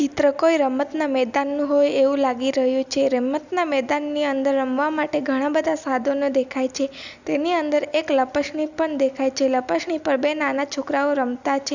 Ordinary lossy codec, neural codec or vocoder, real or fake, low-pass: none; none; real; 7.2 kHz